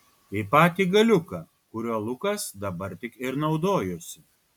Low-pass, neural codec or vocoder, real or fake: 19.8 kHz; none; real